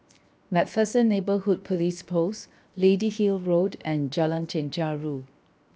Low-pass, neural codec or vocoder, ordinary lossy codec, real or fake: none; codec, 16 kHz, 0.7 kbps, FocalCodec; none; fake